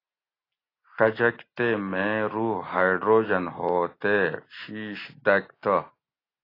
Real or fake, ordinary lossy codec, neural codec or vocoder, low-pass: real; AAC, 24 kbps; none; 5.4 kHz